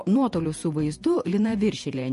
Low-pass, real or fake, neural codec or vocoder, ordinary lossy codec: 14.4 kHz; fake; vocoder, 48 kHz, 128 mel bands, Vocos; MP3, 48 kbps